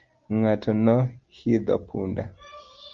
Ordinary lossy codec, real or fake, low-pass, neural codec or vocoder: Opus, 32 kbps; real; 7.2 kHz; none